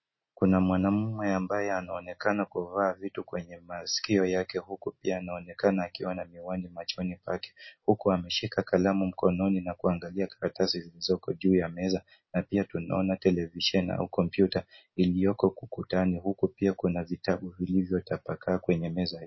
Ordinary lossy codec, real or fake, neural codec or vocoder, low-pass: MP3, 24 kbps; real; none; 7.2 kHz